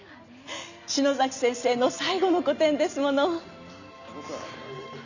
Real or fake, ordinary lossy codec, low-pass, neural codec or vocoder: real; none; 7.2 kHz; none